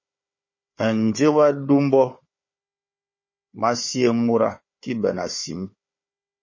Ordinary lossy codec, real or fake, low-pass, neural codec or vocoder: MP3, 32 kbps; fake; 7.2 kHz; codec, 16 kHz, 4 kbps, FunCodec, trained on Chinese and English, 50 frames a second